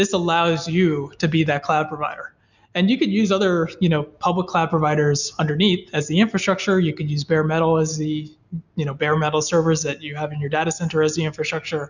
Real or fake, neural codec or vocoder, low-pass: real; none; 7.2 kHz